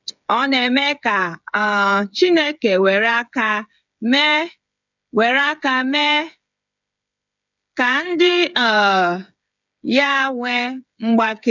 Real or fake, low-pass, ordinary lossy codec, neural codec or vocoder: fake; 7.2 kHz; none; codec, 16 kHz, 8 kbps, FreqCodec, smaller model